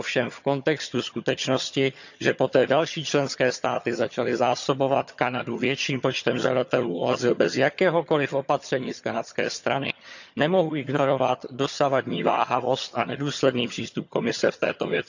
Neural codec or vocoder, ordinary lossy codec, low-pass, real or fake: vocoder, 22.05 kHz, 80 mel bands, HiFi-GAN; none; 7.2 kHz; fake